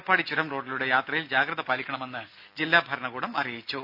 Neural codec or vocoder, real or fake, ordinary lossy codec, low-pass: none; real; Opus, 64 kbps; 5.4 kHz